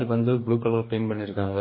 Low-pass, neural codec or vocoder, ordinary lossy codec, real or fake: 5.4 kHz; codec, 44.1 kHz, 2.6 kbps, DAC; MP3, 24 kbps; fake